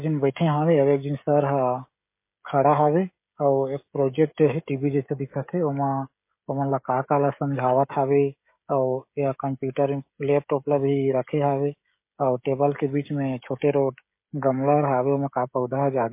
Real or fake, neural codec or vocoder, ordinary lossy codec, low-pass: fake; codec, 16 kHz, 16 kbps, FreqCodec, smaller model; MP3, 24 kbps; 3.6 kHz